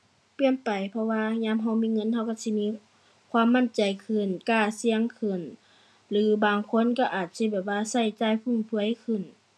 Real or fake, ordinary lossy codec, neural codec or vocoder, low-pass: real; none; none; none